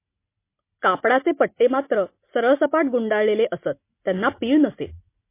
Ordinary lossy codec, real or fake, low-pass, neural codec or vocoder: MP3, 24 kbps; real; 3.6 kHz; none